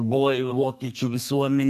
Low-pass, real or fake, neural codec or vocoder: 14.4 kHz; fake; codec, 32 kHz, 1.9 kbps, SNAC